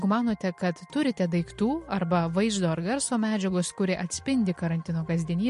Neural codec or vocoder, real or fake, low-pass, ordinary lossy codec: none; real; 14.4 kHz; MP3, 48 kbps